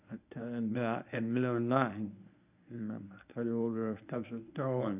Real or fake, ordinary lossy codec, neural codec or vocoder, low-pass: fake; none; codec, 24 kHz, 0.9 kbps, WavTokenizer, medium speech release version 1; 3.6 kHz